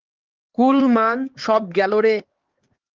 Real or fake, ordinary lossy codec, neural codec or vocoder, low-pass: fake; Opus, 16 kbps; codec, 16 kHz, 4 kbps, X-Codec, HuBERT features, trained on LibriSpeech; 7.2 kHz